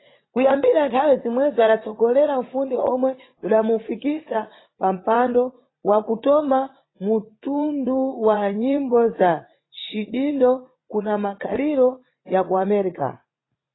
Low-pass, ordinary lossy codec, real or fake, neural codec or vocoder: 7.2 kHz; AAC, 16 kbps; fake; vocoder, 44.1 kHz, 80 mel bands, Vocos